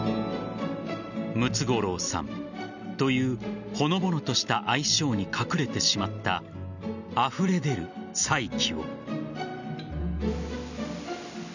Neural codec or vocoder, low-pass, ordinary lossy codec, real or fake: none; 7.2 kHz; none; real